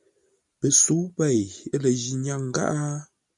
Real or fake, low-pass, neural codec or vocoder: real; 10.8 kHz; none